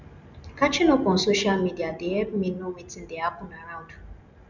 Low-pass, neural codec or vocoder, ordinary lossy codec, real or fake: 7.2 kHz; none; none; real